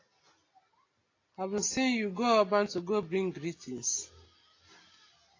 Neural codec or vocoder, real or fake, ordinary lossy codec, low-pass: none; real; AAC, 32 kbps; 7.2 kHz